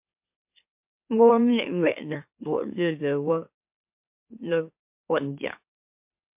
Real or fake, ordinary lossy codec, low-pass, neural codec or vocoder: fake; MP3, 32 kbps; 3.6 kHz; autoencoder, 44.1 kHz, a latent of 192 numbers a frame, MeloTTS